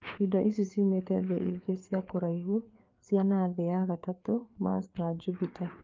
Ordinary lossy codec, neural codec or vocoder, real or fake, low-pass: Opus, 32 kbps; codec, 16 kHz, 4 kbps, FreqCodec, larger model; fake; 7.2 kHz